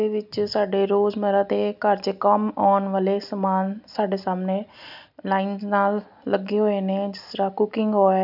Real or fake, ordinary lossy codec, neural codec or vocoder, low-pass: real; none; none; 5.4 kHz